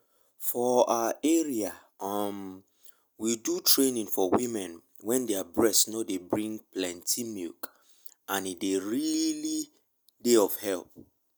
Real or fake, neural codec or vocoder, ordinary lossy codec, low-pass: real; none; none; none